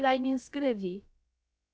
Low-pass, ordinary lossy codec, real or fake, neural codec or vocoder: none; none; fake; codec, 16 kHz, about 1 kbps, DyCAST, with the encoder's durations